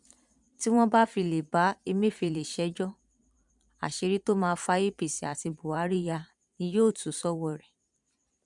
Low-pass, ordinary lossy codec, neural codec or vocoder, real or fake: 10.8 kHz; none; vocoder, 44.1 kHz, 128 mel bands every 512 samples, BigVGAN v2; fake